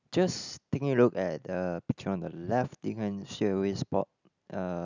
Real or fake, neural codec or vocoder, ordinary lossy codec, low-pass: real; none; none; 7.2 kHz